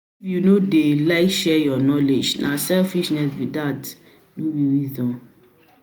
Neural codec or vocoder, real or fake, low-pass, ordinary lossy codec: none; real; none; none